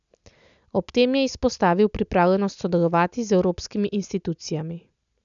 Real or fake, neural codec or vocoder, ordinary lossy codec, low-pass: real; none; none; 7.2 kHz